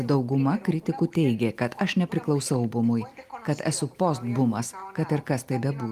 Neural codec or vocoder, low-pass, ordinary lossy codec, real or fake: none; 14.4 kHz; Opus, 32 kbps; real